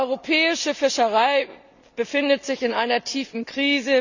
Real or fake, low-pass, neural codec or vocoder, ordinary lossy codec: real; 7.2 kHz; none; none